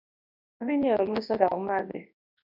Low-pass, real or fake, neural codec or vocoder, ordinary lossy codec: 5.4 kHz; fake; codec, 24 kHz, 0.9 kbps, WavTokenizer, large speech release; MP3, 48 kbps